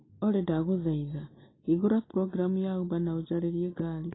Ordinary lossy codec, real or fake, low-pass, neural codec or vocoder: AAC, 16 kbps; real; 7.2 kHz; none